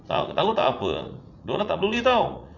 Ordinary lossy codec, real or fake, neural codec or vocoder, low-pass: none; fake; vocoder, 44.1 kHz, 80 mel bands, Vocos; 7.2 kHz